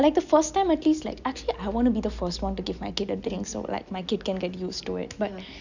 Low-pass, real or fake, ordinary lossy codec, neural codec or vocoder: 7.2 kHz; real; none; none